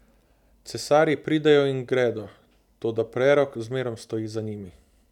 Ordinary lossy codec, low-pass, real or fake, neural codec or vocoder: none; 19.8 kHz; real; none